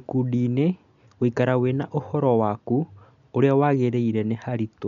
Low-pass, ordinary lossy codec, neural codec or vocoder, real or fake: 7.2 kHz; none; none; real